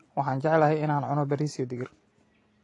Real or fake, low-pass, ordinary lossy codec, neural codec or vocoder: real; 10.8 kHz; AAC, 32 kbps; none